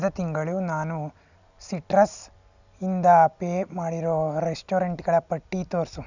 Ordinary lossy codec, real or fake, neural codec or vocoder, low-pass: none; real; none; 7.2 kHz